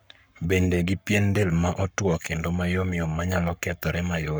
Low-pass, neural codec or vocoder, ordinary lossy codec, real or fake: none; codec, 44.1 kHz, 7.8 kbps, Pupu-Codec; none; fake